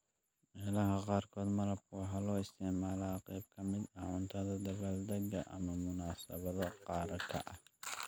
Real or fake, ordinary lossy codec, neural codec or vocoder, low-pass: real; none; none; none